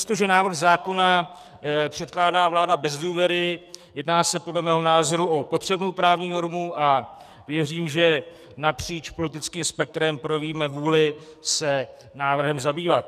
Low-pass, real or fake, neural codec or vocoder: 14.4 kHz; fake; codec, 44.1 kHz, 2.6 kbps, SNAC